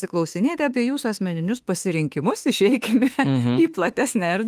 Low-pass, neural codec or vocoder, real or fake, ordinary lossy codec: 14.4 kHz; autoencoder, 48 kHz, 32 numbers a frame, DAC-VAE, trained on Japanese speech; fake; Opus, 32 kbps